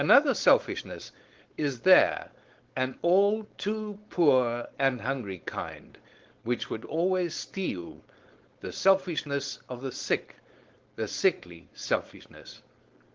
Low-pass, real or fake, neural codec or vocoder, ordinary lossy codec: 7.2 kHz; fake; codec, 16 kHz, 4.8 kbps, FACodec; Opus, 16 kbps